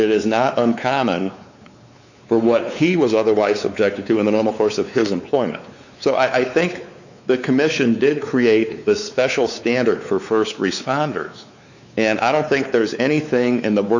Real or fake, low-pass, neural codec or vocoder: fake; 7.2 kHz; codec, 16 kHz, 4 kbps, X-Codec, WavLM features, trained on Multilingual LibriSpeech